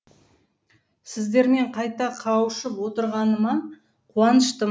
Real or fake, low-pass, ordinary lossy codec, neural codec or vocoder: real; none; none; none